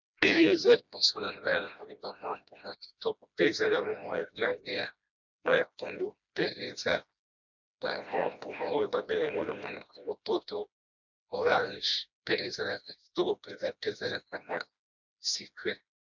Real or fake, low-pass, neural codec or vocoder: fake; 7.2 kHz; codec, 16 kHz, 1 kbps, FreqCodec, smaller model